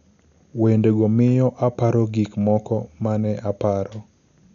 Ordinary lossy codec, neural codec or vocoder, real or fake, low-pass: none; none; real; 7.2 kHz